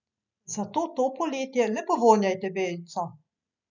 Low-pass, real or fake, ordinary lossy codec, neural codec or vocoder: 7.2 kHz; real; none; none